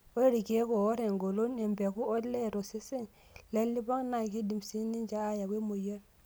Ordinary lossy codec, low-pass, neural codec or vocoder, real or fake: none; none; none; real